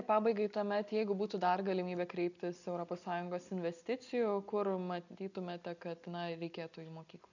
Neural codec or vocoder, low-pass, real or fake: none; 7.2 kHz; real